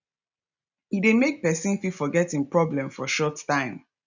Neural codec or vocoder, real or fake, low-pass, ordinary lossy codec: none; real; 7.2 kHz; none